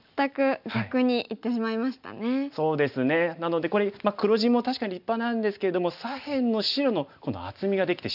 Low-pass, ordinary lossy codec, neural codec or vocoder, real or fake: 5.4 kHz; none; none; real